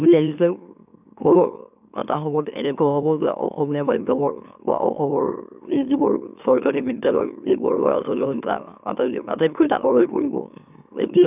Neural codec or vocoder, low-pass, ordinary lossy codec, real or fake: autoencoder, 44.1 kHz, a latent of 192 numbers a frame, MeloTTS; 3.6 kHz; none; fake